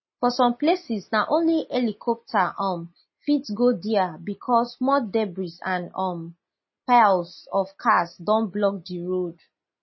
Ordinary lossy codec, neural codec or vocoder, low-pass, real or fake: MP3, 24 kbps; none; 7.2 kHz; real